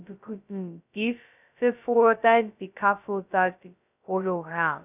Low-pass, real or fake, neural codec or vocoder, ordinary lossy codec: 3.6 kHz; fake; codec, 16 kHz, 0.2 kbps, FocalCodec; none